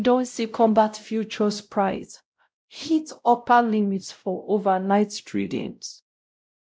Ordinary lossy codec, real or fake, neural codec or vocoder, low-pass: none; fake; codec, 16 kHz, 0.5 kbps, X-Codec, WavLM features, trained on Multilingual LibriSpeech; none